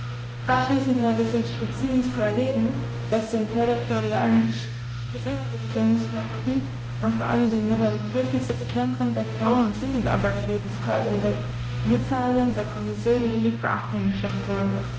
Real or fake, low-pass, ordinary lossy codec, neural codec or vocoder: fake; none; none; codec, 16 kHz, 0.5 kbps, X-Codec, HuBERT features, trained on balanced general audio